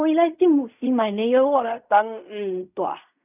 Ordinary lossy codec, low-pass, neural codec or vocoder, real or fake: none; 3.6 kHz; codec, 16 kHz in and 24 kHz out, 0.4 kbps, LongCat-Audio-Codec, fine tuned four codebook decoder; fake